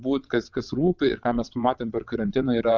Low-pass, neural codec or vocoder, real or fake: 7.2 kHz; vocoder, 22.05 kHz, 80 mel bands, WaveNeXt; fake